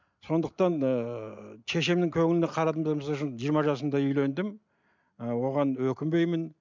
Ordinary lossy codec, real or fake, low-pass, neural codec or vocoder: MP3, 64 kbps; real; 7.2 kHz; none